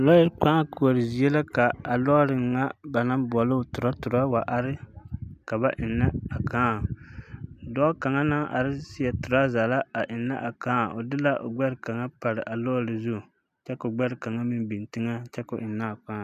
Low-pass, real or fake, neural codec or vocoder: 14.4 kHz; real; none